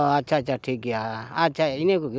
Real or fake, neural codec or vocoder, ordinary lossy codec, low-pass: real; none; none; none